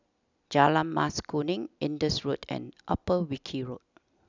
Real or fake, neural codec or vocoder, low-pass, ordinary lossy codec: real; none; 7.2 kHz; none